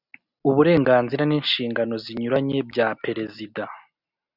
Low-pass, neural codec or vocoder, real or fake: 5.4 kHz; none; real